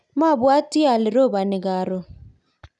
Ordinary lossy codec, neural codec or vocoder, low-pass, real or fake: none; none; 10.8 kHz; real